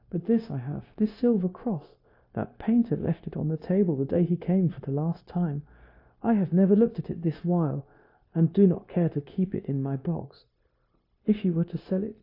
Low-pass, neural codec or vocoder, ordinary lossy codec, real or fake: 5.4 kHz; codec, 16 kHz, 0.9 kbps, LongCat-Audio-Codec; AAC, 48 kbps; fake